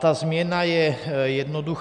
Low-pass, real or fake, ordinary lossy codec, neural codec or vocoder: 10.8 kHz; real; MP3, 96 kbps; none